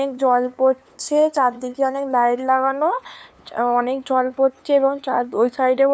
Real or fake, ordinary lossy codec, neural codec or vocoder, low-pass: fake; none; codec, 16 kHz, 2 kbps, FunCodec, trained on LibriTTS, 25 frames a second; none